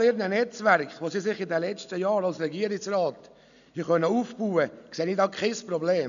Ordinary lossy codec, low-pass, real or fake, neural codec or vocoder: none; 7.2 kHz; real; none